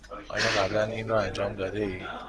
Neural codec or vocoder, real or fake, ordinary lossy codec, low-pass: none; real; Opus, 16 kbps; 10.8 kHz